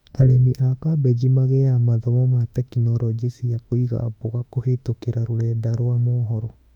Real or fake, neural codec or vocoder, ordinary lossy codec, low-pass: fake; autoencoder, 48 kHz, 32 numbers a frame, DAC-VAE, trained on Japanese speech; none; 19.8 kHz